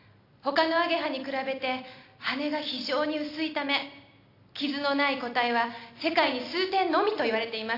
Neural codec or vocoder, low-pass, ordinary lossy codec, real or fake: none; 5.4 kHz; AAC, 32 kbps; real